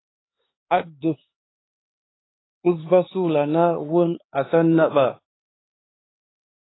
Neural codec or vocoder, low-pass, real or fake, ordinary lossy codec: codec, 16 kHz, 4 kbps, X-Codec, HuBERT features, trained on LibriSpeech; 7.2 kHz; fake; AAC, 16 kbps